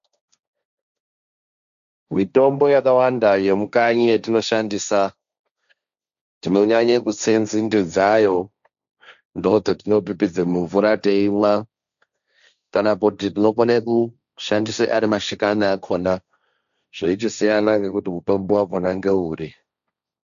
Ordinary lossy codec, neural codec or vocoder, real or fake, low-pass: AAC, 96 kbps; codec, 16 kHz, 1.1 kbps, Voila-Tokenizer; fake; 7.2 kHz